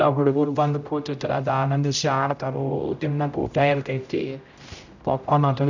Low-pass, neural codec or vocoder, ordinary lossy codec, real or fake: 7.2 kHz; codec, 16 kHz, 0.5 kbps, X-Codec, HuBERT features, trained on general audio; none; fake